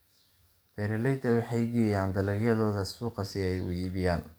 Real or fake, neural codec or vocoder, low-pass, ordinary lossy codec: fake; codec, 44.1 kHz, 7.8 kbps, DAC; none; none